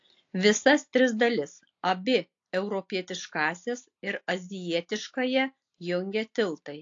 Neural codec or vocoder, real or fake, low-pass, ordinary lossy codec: none; real; 7.2 kHz; AAC, 48 kbps